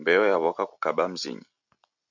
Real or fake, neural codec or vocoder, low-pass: real; none; 7.2 kHz